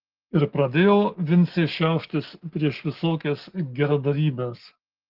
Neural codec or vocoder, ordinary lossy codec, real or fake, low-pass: none; Opus, 16 kbps; real; 5.4 kHz